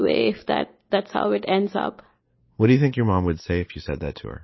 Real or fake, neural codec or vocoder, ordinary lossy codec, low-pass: real; none; MP3, 24 kbps; 7.2 kHz